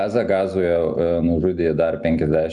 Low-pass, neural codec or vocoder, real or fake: 10.8 kHz; none; real